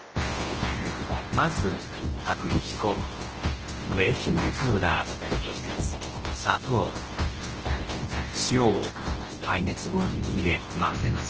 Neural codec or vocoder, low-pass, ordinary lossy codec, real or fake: codec, 24 kHz, 0.9 kbps, WavTokenizer, large speech release; 7.2 kHz; Opus, 16 kbps; fake